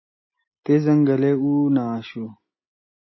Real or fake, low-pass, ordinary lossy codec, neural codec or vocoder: real; 7.2 kHz; MP3, 24 kbps; none